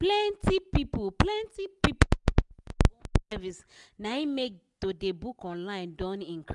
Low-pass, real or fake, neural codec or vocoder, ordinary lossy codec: 10.8 kHz; real; none; none